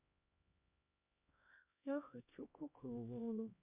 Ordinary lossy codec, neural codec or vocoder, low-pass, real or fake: MP3, 32 kbps; codec, 16 kHz, 1 kbps, X-Codec, HuBERT features, trained on LibriSpeech; 3.6 kHz; fake